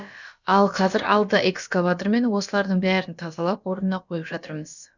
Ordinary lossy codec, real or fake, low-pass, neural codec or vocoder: none; fake; 7.2 kHz; codec, 16 kHz, about 1 kbps, DyCAST, with the encoder's durations